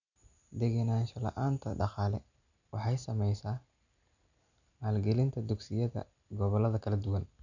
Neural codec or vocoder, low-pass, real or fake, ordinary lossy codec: none; 7.2 kHz; real; none